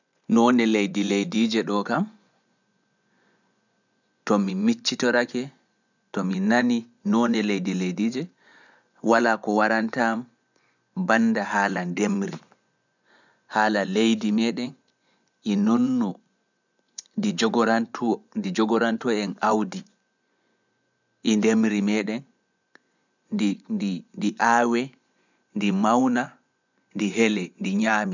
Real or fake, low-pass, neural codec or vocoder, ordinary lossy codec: fake; 7.2 kHz; vocoder, 24 kHz, 100 mel bands, Vocos; none